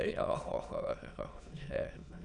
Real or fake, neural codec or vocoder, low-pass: fake; autoencoder, 22.05 kHz, a latent of 192 numbers a frame, VITS, trained on many speakers; 9.9 kHz